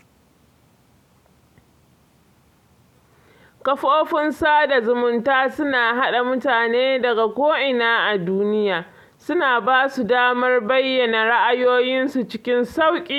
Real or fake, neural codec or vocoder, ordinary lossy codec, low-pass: real; none; none; 19.8 kHz